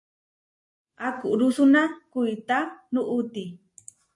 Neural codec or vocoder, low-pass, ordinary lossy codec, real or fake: none; 10.8 kHz; MP3, 48 kbps; real